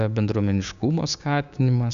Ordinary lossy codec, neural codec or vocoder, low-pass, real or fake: AAC, 96 kbps; codec, 16 kHz, 6 kbps, DAC; 7.2 kHz; fake